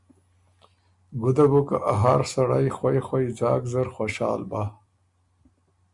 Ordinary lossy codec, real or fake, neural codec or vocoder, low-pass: MP3, 96 kbps; real; none; 10.8 kHz